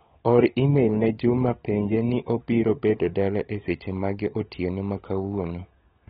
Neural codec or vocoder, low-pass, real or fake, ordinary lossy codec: codec, 16 kHz, 16 kbps, FunCodec, trained on LibriTTS, 50 frames a second; 7.2 kHz; fake; AAC, 16 kbps